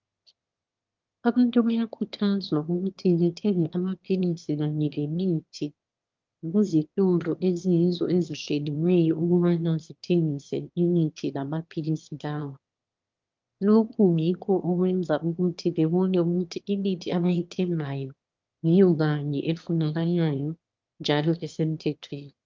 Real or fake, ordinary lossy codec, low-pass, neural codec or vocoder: fake; Opus, 32 kbps; 7.2 kHz; autoencoder, 22.05 kHz, a latent of 192 numbers a frame, VITS, trained on one speaker